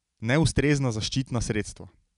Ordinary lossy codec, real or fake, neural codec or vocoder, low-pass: none; real; none; 10.8 kHz